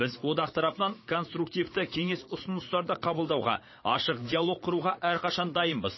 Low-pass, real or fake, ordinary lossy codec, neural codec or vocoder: 7.2 kHz; fake; MP3, 24 kbps; vocoder, 22.05 kHz, 80 mel bands, Vocos